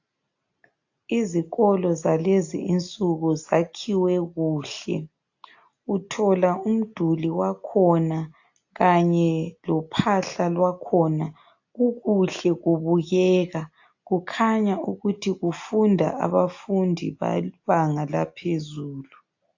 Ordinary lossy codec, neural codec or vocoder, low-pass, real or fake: AAC, 48 kbps; none; 7.2 kHz; real